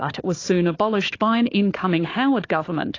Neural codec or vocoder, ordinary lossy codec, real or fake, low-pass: codec, 16 kHz, 4 kbps, FunCodec, trained on Chinese and English, 50 frames a second; AAC, 32 kbps; fake; 7.2 kHz